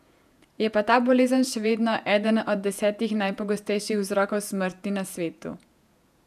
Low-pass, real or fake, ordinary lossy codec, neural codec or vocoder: 14.4 kHz; fake; none; vocoder, 48 kHz, 128 mel bands, Vocos